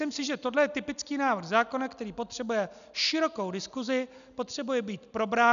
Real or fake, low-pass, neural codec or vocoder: real; 7.2 kHz; none